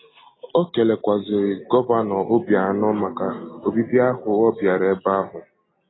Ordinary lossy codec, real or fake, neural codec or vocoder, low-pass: AAC, 16 kbps; real; none; 7.2 kHz